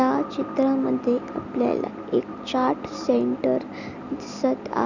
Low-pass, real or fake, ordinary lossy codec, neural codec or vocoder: 7.2 kHz; real; none; none